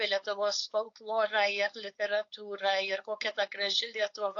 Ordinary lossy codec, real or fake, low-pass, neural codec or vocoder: AAC, 48 kbps; fake; 7.2 kHz; codec, 16 kHz, 4.8 kbps, FACodec